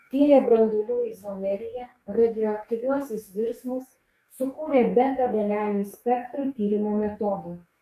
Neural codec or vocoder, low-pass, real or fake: codec, 44.1 kHz, 2.6 kbps, DAC; 14.4 kHz; fake